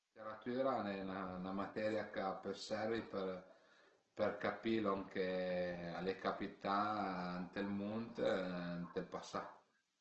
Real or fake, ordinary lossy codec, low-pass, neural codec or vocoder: real; Opus, 16 kbps; 7.2 kHz; none